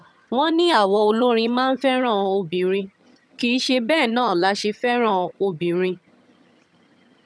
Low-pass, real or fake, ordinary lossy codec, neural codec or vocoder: none; fake; none; vocoder, 22.05 kHz, 80 mel bands, HiFi-GAN